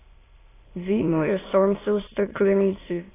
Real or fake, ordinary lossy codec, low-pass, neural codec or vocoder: fake; AAC, 16 kbps; 3.6 kHz; autoencoder, 22.05 kHz, a latent of 192 numbers a frame, VITS, trained on many speakers